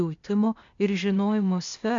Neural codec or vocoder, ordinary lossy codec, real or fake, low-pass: codec, 16 kHz, 0.8 kbps, ZipCodec; MP3, 64 kbps; fake; 7.2 kHz